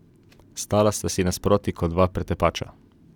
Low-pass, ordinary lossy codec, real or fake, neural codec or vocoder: 19.8 kHz; none; real; none